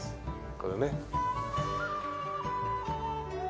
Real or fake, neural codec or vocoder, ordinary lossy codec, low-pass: real; none; none; none